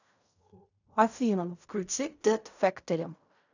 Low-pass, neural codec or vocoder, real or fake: 7.2 kHz; codec, 16 kHz in and 24 kHz out, 0.4 kbps, LongCat-Audio-Codec, fine tuned four codebook decoder; fake